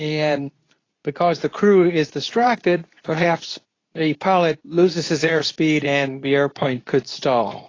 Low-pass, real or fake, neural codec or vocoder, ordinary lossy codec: 7.2 kHz; fake; codec, 24 kHz, 0.9 kbps, WavTokenizer, medium speech release version 2; AAC, 32 kbps